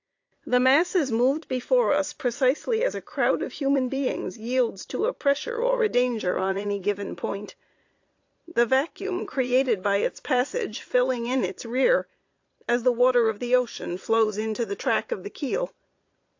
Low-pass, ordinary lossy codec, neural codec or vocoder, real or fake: 7.2 kHz; AAC, 48 kbps; vocoder, 22.05 kHz, 80 mel bands, Vocos; fake